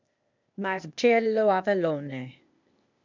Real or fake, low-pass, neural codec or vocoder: fake; 7.2 kHz; codec, 16 kHz, 0.8 kbps, ZipCodec